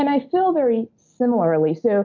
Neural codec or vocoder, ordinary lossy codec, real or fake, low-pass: none; MP3, 48 kbps; real; 7.2 kHz